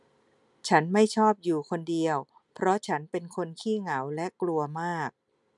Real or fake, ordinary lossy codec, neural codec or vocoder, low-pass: real; none; none; 10.8 kHz